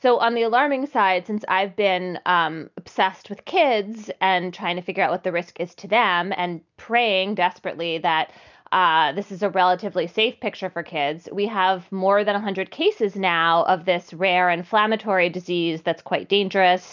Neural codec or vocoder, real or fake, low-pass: none; real; 7.2 kHz